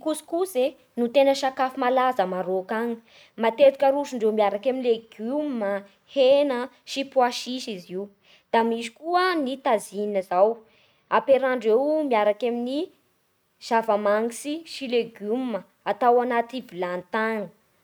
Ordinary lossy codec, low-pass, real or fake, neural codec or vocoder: none; none; real; none